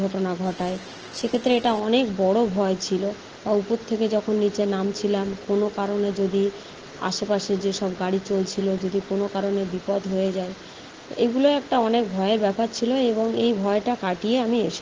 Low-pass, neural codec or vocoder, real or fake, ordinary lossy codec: 7.2 kHz; none; real; Opus, 16 kbps